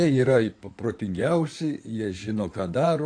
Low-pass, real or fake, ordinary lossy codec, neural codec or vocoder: 9.9 kHz; fake; AAC, 48 kbps; codec, 16 kHz in and 24 kHz out, 2.2 kbps, FireRedTTS-2 codec